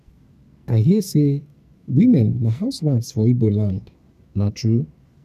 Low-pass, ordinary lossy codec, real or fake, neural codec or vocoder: 14.4 kHz; none; fake; codec, 44.1 kHz, 2.6 kbps, SNAC